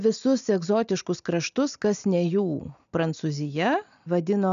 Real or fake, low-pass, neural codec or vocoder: real; 7.2 kHz; none